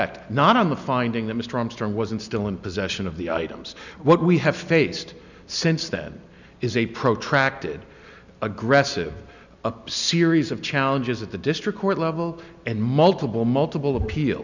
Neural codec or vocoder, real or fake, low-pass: none; real; 7.2 kHz